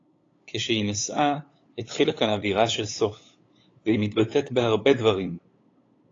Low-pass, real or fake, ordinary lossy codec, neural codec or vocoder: 7.2 kHz; fake; AAC, 32 kbps; codec, 16 kHz, 8 kbps, FunCodec, trained on LibriTTS, 25 frames a second